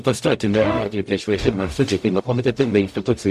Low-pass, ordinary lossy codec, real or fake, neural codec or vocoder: 14.4 kHz; MP3, 64 kbps; fake; codec, 44.1 kHz, 0.9 kbps, DAC